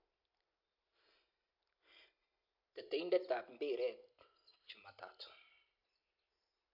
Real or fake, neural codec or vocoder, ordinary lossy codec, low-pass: real; none; MP3, 48 kbps; 5.4 kHz